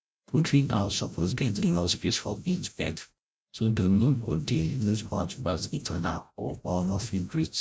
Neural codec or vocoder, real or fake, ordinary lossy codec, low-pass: codec, 16 kHz, 0.5 kbps, FreqCodec, larger model; fake; none; none